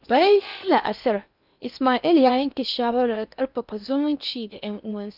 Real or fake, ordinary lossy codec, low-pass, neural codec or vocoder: fake; none; 5.4 kHz; codec, 16 kHz in and 24 kHz out, 0.8 kbps, FocalCodec, streaming, 65536 codes